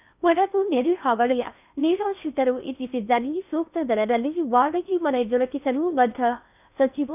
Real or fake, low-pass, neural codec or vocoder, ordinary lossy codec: fake; 3.6 kHz; codec, 16 kHz in and 24 kHz out, 0.6 kbps, FocalCodec, streaming, 4096 codes; none